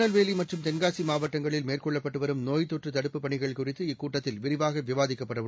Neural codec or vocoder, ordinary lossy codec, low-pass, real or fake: none; none; none; real